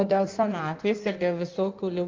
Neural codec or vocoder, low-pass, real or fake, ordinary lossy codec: codec, 16 kHz in and 24 kHz out, 1.1 kbps, FireRedTTS-2 codec; 7.2 kHz; fake; Opus, 32 kbps